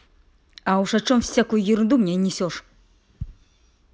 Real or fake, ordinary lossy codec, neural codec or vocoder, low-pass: real; none; none; none